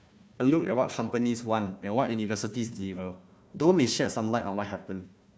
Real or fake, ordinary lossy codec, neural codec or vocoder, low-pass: fake; none; codec, 16 kHz, 1 kbps, FunCodec, trained on Chinese and English, 50 frames a second; none